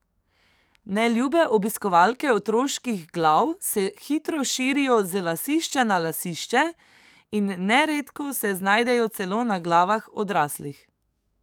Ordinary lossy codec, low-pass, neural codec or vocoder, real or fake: none; none; codec, 44.1 kHz, 7.8 kbps, DAC; fake